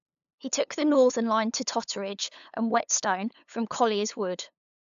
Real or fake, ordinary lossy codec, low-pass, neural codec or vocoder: fake; AAC, 96 kbps; 7.2 kHz; codec, 16 kHz, 8 kbps, FunCodec, trained on LibriTTS, 25 frames a second